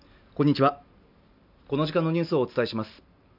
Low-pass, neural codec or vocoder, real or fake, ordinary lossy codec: 5.4 kHz; none; real; none